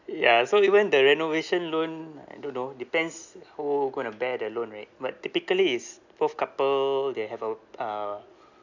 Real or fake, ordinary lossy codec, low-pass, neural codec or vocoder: real; none; 7.2 kHz; none